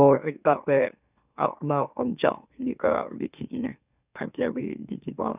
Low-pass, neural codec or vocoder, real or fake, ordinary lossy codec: 3.6 kHz; autoencoder, 44.1 kHz, a latent of 192 numbers a frame, MeloTTS; fake; none